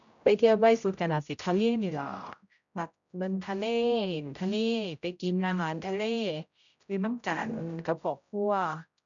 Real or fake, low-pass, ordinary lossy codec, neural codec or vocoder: fake; 7.2 kHz; none; codec, 16 kHz, 0.5 kbps, X-Codec, HuBERT features, trained on general audio